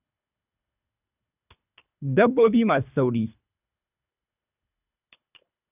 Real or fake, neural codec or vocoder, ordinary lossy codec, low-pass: fake; codec, 24 kHz, 3 kbps, HILCodec; none; 3.6 kHz